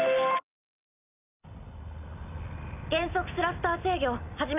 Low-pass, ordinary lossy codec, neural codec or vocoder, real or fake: 3.6 kHz; none; none; real